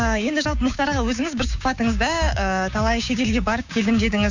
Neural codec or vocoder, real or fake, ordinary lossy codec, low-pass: codec, 16 kHz, 6 kbps, DAC; fake; none; 7.2 kHz